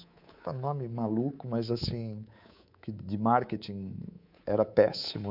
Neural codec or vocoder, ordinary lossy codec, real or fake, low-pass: codec, 24 kHz, 3.1 kbps, DualCodec; none; fake; 5.4 kHz